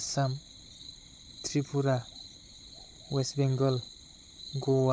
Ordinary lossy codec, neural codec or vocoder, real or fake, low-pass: none; codec, 16 kHz, 16 kbps, FunCodec, trained on Chinese and English, 50 frames a second; fake; none